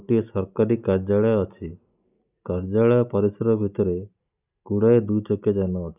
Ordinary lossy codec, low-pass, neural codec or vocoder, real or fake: none; 3.6 kHz; none; real